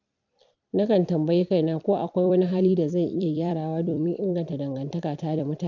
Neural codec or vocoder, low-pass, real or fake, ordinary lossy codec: vocoder, 44.1 kHz, 128 mel bands every 256 samples, BigVGAN v2; 7.2 kHz; fake; none